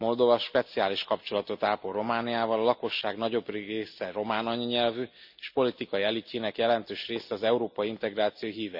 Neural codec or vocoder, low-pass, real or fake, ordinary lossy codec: none; 5.4 kHz; real; none